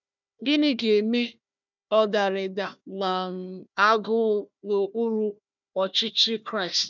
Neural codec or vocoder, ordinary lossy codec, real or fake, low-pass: codec, 16 kHz, 1 kbps, FunCodec, trained on Chinese and English, 50 frames a second; none; fake; 7.2 kHz